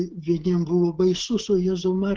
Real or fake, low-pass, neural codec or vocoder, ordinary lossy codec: fake; 7.2 kHz; codec, 16 kHz, 16 kbps, FreqCodec, smaller model; Opus, 16 kbps